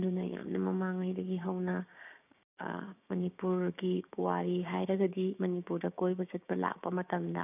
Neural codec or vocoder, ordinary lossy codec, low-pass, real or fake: codec, 16 kHz, 6 kbps, DAC; none; 3.6 kHz; fake